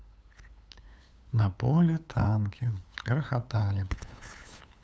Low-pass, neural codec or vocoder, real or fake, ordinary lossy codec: none; codec, 16 kHz, 8 kbps, FunCodec, trained on LibriTTS, 25 frames a second; fake; none